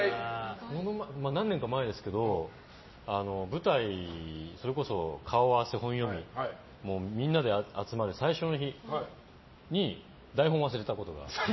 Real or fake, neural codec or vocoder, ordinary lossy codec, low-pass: real; none; MP3, 24 kbps; 7.2 kHz